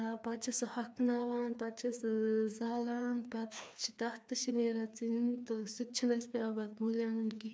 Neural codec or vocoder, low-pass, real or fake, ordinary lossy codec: codec, 16 kHz, 2 kbps, FreqCodec, larger model; none; fake; none